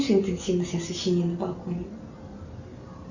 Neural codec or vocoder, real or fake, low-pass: none; real; 7.2 kHz